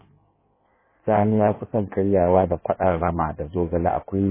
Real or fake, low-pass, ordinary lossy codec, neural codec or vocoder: fake; 3.6 kHz; MP3, 16 kbps; codec, 16 kHz in and 24 kHz out, 1.1 kbps, FireRedTTS-2 codec